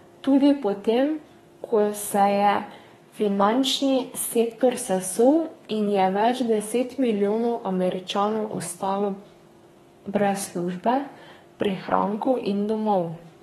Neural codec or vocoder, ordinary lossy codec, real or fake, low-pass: codec, 32 kHz, 1.9 kbps, SNAC; AAC, 32 kbps; fake; 14.4 kHz